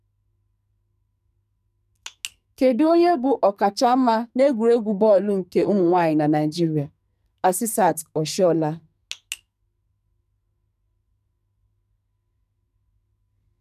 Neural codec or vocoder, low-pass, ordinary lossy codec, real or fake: codec, 44.1 kHz, 2.6 kbps, SNAC; 14.4 kHz; none; fake